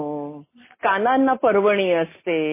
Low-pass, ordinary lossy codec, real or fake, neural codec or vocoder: 3.6 kHz; MP3, 16 kbps; real; none